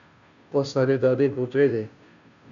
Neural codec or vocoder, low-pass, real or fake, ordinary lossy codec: codec, 16 kHz, 0.5 kbps, FunCodec, trained on Chinese and English, 25 frames a second; 7.2 kHz; fake; MP3, 64 kbps